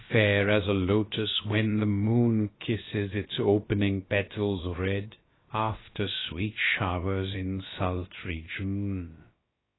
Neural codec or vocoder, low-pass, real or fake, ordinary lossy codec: codec, 16 kHz, about 1 kbps, DyCAST, with the encoder's durations; 7.2 kHz; fake; AAC, 16 kbps